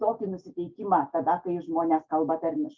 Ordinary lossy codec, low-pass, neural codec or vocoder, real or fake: Opus, 24 kbps; 7.2 kHz; none; real